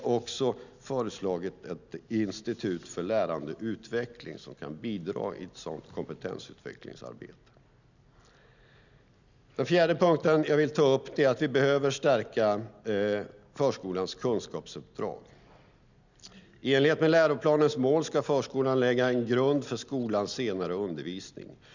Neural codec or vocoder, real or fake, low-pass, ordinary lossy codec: none; real; 7.2 kHz; none